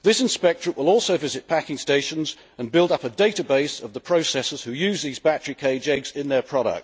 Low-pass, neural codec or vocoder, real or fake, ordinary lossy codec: none; none; real; none